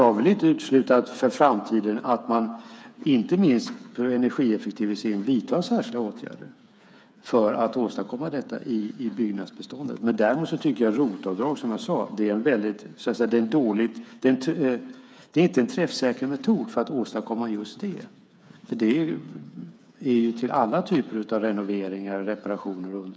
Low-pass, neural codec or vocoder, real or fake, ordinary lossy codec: none; codec, 16 kHz, 8 kbps, FreqCodec, smaller model; fake; none